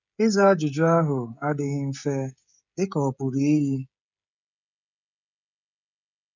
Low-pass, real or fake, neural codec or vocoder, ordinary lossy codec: 7.2 kHz; fake; codec, 16 kHz, 16 kbps, FreqCodec, smaller model; none